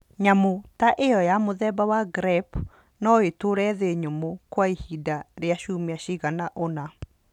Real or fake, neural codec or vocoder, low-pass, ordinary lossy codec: real; none; 19.8 kHz; none